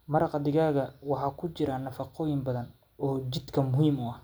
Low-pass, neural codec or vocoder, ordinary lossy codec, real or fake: none; none; none; real